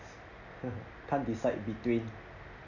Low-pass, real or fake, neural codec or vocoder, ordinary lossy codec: 7.2 kHz; real; none; none